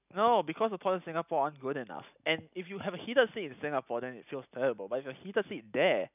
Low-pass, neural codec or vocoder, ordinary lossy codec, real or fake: 3.6 kHz; none; none; real